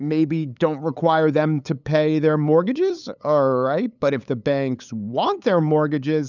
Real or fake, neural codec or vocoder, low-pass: fake; codec, 16 kHz, 8 kbps, FunCodec, trained on LibriTTS, 25 frames a second; 7.2 kHz